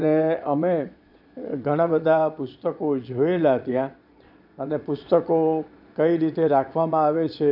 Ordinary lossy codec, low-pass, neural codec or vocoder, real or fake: none; 5.4 kHz; vocoder, 22.05 kHz, 80 mel bands, Vocos; fake